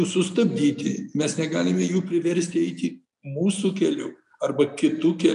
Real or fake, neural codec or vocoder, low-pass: real; none; 10.8 kHz